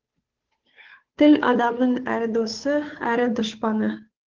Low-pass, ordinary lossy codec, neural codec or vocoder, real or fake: 7.2 kHz; Opus, 16 kbps; codec, 16 kHz, 2 kbps, FunCodec, trained on Chinese and English, 25 frames a second; fake